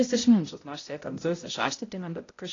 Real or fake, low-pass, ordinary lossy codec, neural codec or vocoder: fake; 7.2 kHz; AAC, 32 kbps; codec, 16 kHz, 0.5 kbps, X-Codec, HuBERT features, trained on balanced general audio